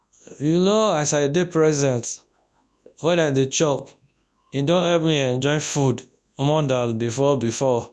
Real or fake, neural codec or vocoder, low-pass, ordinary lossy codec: fake; codec, 24 kHz, 0.9 kbps, WavTokenizer, large speech release; none; none